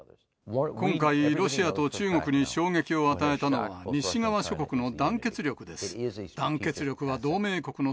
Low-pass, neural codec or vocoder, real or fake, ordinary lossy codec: none; none; real; none